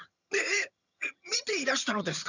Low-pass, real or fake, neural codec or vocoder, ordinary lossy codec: 7.2 kHz; fake; vocoder, 22.05 kHz, 80 mel bands, HiFi-GAN; none